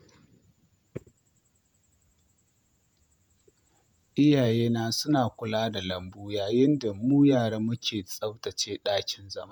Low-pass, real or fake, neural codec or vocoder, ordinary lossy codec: 19.8 kHz; fake; vocoder, 48 kHz, 128 mel bands, Vocos; none